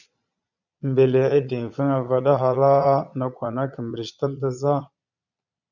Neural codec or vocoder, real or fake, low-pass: vocoder, 22.05 kHz, 80 mel bands, Vocos; fake; 7.2 kHz